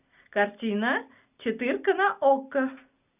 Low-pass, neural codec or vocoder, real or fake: 3.6 kHz; none; real